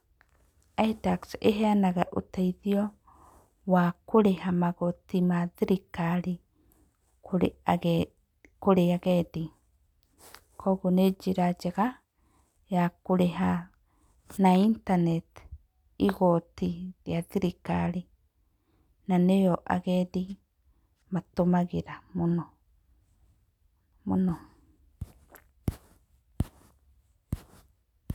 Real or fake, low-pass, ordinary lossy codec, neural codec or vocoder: real; 19.8 kHz; none; none